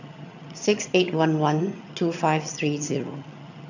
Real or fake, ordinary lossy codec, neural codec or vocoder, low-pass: fake; none; vocoder, 22.05 kHz, 80 mel bands, HiFi-GAN; 7.2 kHz